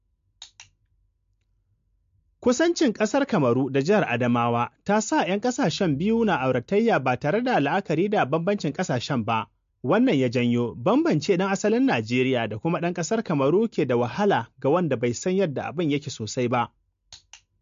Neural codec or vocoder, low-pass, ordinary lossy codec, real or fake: none; 7.2 kHz; MP3, 48 kbps; real